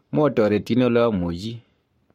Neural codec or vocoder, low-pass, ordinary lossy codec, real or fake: codec, 44.1 kHz, 7.8 kbps, Pupu-Codec; 19.8 kHz; MP3, 64 kbps; fake